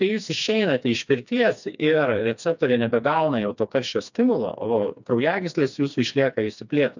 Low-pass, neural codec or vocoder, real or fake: 7.2 kHz; codec, 16 kHz, 2 kbps, FreqCodec, smaller model; fake